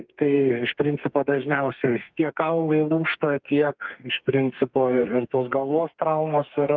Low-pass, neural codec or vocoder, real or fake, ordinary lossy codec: 7.2 kHz; codec, 32 kHz, 1.9 kbps, SNAC; fake; Opus, 32 kbps